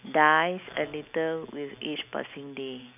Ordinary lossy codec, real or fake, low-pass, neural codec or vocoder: none; real; 3.6 kHz; none